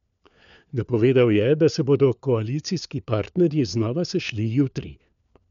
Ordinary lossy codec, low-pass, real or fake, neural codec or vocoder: none; 7.2 kHz; fake; codec, 16 kHz, 4 kbps, FunCodec, trained on LibriTTS, 50 frames a second